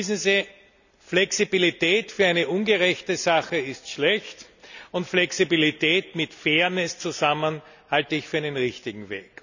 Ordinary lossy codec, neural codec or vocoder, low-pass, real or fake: none; none; 7.2 kHz; real